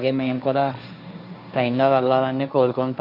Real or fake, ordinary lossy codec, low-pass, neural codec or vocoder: fake; none; 5.4 kHz; codec, 16 kHz, 1.1 kbps, Voila-Tokenizer